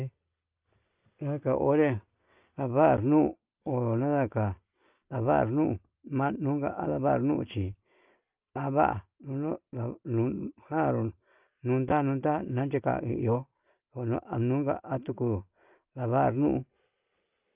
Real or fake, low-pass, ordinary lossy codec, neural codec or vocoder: real; 3.6 kHz; Opus, 24 kbps; none